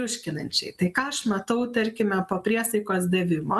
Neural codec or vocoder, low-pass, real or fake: none; 14.4 kHz; real